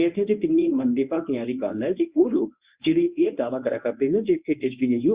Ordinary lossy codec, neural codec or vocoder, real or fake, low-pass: Opus, 64 kbps; codec, 24 kHz, 0.9 kbps, WavTokenizer, medium speech release version 1; fake; 3.6 kHz